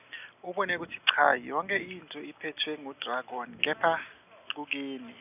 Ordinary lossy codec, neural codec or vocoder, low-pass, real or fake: none; none; 3.6 kHz; real